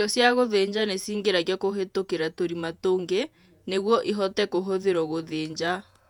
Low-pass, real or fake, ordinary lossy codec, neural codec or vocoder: 19.8 kHz; fake; none; vocoder, 48 kHz, 128 mel bands, Vocos